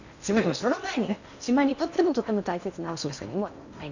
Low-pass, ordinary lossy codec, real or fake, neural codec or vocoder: 7.2 kHz; none; fake; codec, 16 kHz in and 24 kHz out, 0.8 kbps, FocalCodec, streaming, 65536 codes